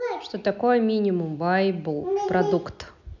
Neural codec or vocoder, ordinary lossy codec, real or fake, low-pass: none; none; real; 7.2 kHz